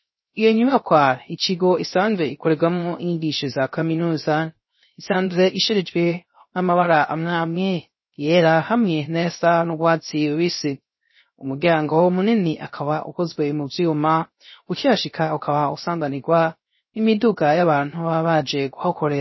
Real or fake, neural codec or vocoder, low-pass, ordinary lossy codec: fake; codec, 16 kHz, 0.3 kbps, FocalCodec; 7.2 kHz; MP3, 24 kbps